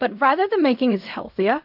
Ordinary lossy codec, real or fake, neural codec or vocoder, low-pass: AAC, 48 kbps; fake; codec, 16 kHz in and 24 kHz out, 0.4 kbps, LongCat-Audio-Codec, fine tuned four codebook decoder; 5.4 kHz